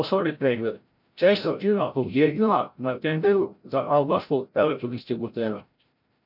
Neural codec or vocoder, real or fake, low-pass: codec, 16 kHz, 0.5 kbps, FreqCodec, larger model; fake; 5.4 kHz